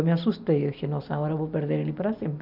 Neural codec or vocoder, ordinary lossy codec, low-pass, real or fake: none; none; 5.4 kHz; real